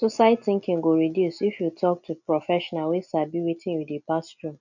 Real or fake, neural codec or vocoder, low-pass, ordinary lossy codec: real; none; 7.2 kHz; none